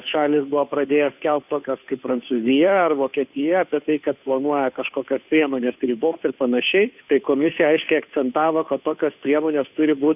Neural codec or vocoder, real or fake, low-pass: codec, 16 kHz, 2 kbps, FunCodec, trained on Chinese and English, 25 frames a second; fake; 3.6 kHz